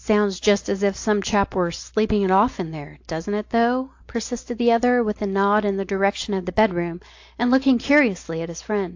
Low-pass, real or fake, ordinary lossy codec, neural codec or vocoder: 7.2 kHz; real; AAC, 48 kbps; none